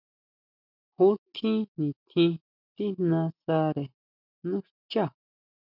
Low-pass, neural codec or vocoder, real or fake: 5.4 kHz; none; real